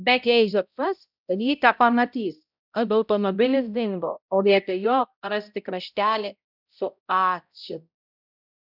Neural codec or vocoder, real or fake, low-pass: codec, 16 kHz, 0.5 kbps, X-Codec, HuBERT features, trained on balanced general audio; fake; 5.4 kHz